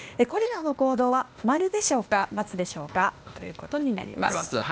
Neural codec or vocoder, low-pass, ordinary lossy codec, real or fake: codec, 16 kHz, 0.8 kbps, ZipCodec; none; none; fake